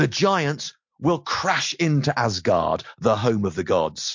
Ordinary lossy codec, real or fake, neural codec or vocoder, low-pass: MP3, 48 kbps; real; none; 7.2 kHz